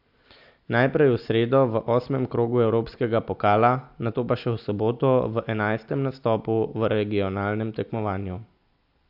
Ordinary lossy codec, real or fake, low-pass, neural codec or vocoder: AAC, 48 kbps; real; 5.4 kHz; none